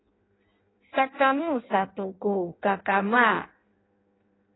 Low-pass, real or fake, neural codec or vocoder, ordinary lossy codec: 7.2 kHz; fake; codec, 16 kHz in and 24 kHz out, 0.6 kbps, FireRedTTS-2 codec; AAC, 16 kbps